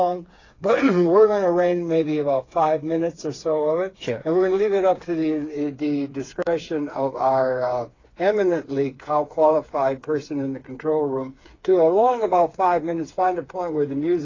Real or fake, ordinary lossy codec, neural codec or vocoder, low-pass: fake; AAC, 32 kbps; codec, 16 kHz, 4 kbps, FreqCodec, smaller model; 7.2 kHz